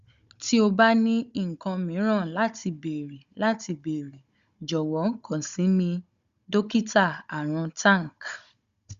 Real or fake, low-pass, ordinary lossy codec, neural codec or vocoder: fake; 7.2 kHz; Opus, 64 kbps; codec, 16 kHz, 16 kbps, FunCodec, trained on Chinese and English, 50 frames a second